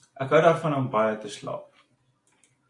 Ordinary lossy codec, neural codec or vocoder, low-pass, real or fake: AAC, 48 kbps; none; 10.8 kHz; real